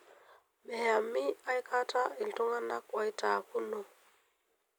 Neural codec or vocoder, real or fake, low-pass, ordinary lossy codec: none; real; none; none